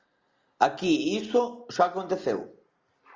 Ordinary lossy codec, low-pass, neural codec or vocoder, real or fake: Opus, 32 kbps; 7.2 kHz; none; real